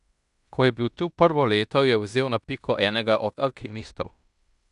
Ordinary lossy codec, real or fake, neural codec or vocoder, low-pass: none; fake; codec, 16 kHz in and 24 kHz out, 0.9 kbps, LongCat-Audio-Codec, fine tuned four codebook decoder; 10.8 kHz